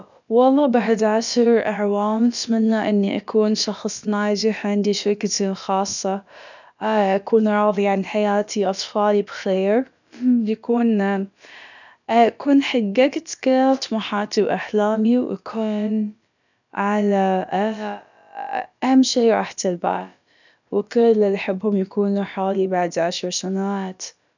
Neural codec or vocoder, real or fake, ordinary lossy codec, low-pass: codec, 16 kHz, about 1 kbps, DyCAST, with the encoder's durations; fake; none; 7.2 kHz